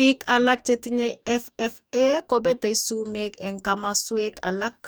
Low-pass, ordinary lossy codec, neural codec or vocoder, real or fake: none; none; codec, 44.1 kHz, 2.6 kbps, DAC; fake